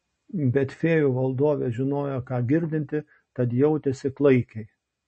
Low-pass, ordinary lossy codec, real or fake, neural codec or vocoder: 10.8 kHz; MP3, 32 kbps; real; none